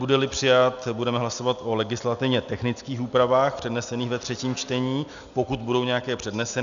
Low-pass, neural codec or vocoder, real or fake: 7.2 kHz; none; real